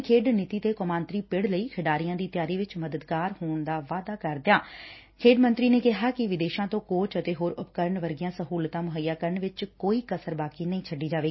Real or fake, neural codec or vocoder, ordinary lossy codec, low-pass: real; none; MP3, 24 kbps; 7.2 kHz